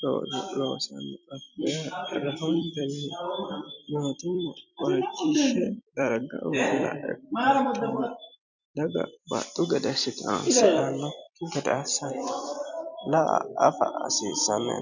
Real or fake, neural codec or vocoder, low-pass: fake; vocoder, 44.1 kHz, 128 mel bands every 256 samples, BigVGAN v2; 7.2 kHz